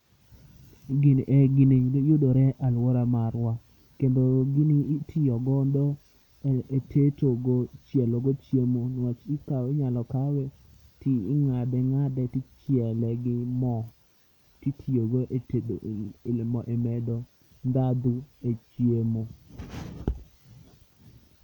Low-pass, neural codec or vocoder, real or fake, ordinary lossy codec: 19.8 kHz; none; real; none